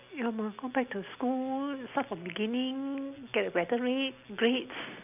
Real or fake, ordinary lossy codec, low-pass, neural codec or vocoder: real; none; 3.6 kHz; none